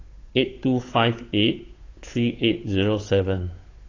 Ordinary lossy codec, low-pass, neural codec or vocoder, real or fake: AAC, 32 kbps; 7.2 kHz; codec, 16 kHz, 8 kbps, FunCodec, trained on Chinese and English, 25 frames a second; fake